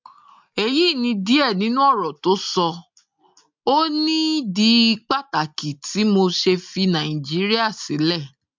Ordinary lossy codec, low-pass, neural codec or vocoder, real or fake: MP3, 64 kbps; 7.2 kHz; none; real